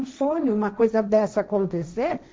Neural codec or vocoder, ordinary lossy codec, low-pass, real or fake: codec, 16 kHz, 1.1 kbps, Voila-Tokenizer; none; none; fake